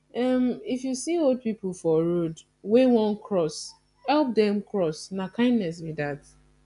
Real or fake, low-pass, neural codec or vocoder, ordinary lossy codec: real; 10.8 kHz; none; none